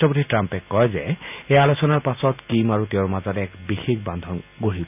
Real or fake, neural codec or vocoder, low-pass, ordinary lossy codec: real; none; 3.6 kHz; none